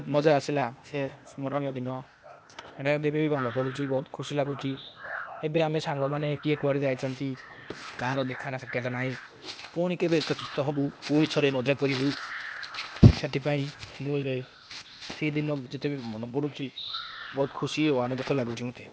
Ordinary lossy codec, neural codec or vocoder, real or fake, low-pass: none; codec, 16 kHz, 0.8 kbps, ZipCodec; fake; none